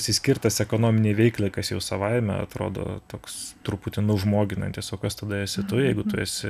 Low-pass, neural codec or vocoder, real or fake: 14.4 kHz; none; real